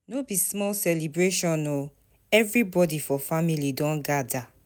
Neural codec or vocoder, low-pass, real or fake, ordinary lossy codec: none; none; real; none